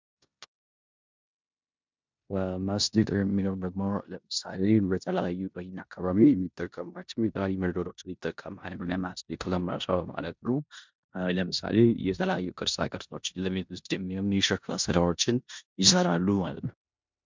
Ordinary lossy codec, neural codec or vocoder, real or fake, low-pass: MP3, 64 kbps; codec, 16 kHz in and 24 kHz out, 0.9 kbps, LongCat-Audio-Codec, four codebook decoder; fake; 7.2 kHz